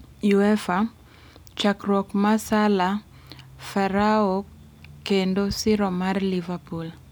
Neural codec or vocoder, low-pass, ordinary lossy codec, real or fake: none; none; none; real